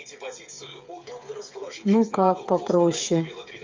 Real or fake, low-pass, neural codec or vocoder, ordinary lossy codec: fake; 7.2 kHz; codec, 16 kHz, 8 kbps, FreqCodec, smaller model; Opus, 32 kbps